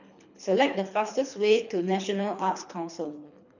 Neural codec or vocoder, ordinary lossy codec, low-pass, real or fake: codec, 24 kHz, 3 kbps, HILCodec; none; 7.2 kHz; fake